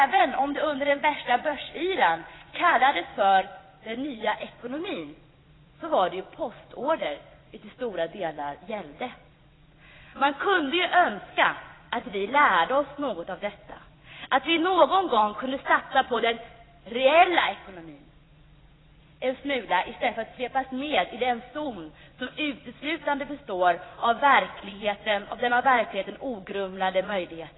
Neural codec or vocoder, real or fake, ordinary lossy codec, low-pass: vocoder, 22.05 kHz, 80 mel bands, Vocos; fake; AAC, 16 kbps; 7.2 kHz